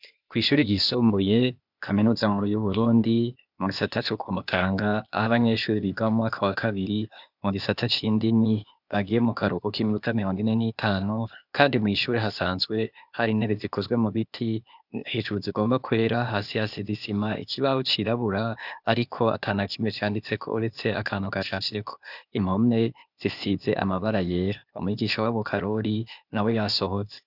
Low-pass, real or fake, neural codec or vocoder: 5.4 kHz; fake; codec, 16 kHz, 0.8 kbps, ZipCodec